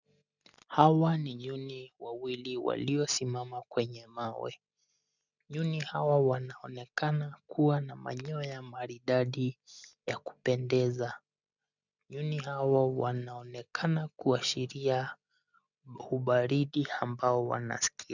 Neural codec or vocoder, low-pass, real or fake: none; 7.2 kHz; real